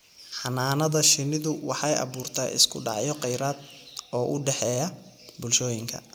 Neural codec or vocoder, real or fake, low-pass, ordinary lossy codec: none; real; none; none